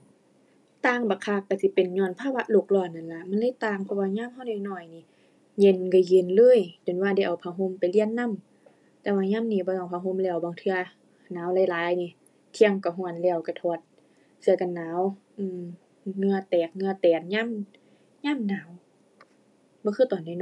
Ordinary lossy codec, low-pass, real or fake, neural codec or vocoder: none; none; real; none